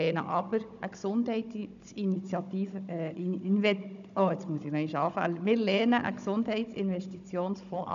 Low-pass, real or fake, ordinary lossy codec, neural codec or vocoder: 7.2 kHz; fake; none; codec, 16 kHz, 16 kbps, FunCodec, trained on Chinese and English, 50 frames a second